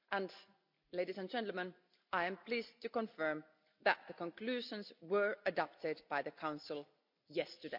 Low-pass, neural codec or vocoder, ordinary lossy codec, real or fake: 5.4 kHz; none; MP3, 48 kbps; real